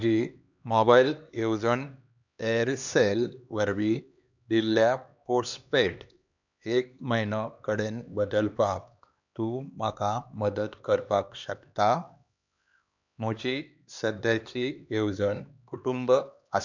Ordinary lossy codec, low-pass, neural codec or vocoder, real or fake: none; 7.2 kHz; codec, 16 kHz, 2 kbps, X-Codec, HuBERT features, trained on LibriSpeech; fake